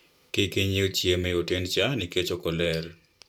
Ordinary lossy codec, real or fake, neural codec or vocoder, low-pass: none; fake; vocoder, 44.1 kHz, 128 mel bands every 512 samples, BigVGAN v2; 19.8 kHz